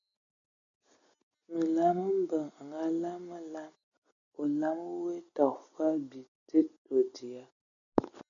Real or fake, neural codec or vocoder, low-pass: real; none; 7.2 kHz